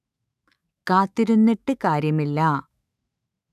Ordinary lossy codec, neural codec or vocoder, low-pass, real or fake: none; autoencoder, 48 kHz, 128 numbers a frame, DAC-VAE, trained on Japanese speech; 14.4 kHz; fake